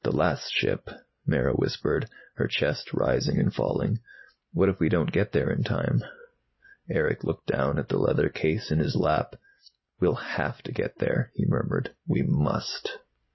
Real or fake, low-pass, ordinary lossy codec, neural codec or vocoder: real; 7.2 kHz; MP3, 24 kbps; none